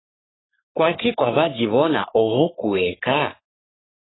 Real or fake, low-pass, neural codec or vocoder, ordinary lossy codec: fake; 7.2 kHz; codec, 44.1 kHz, 3.4 kbps, Pupu-Codec; AAC, 16 kbps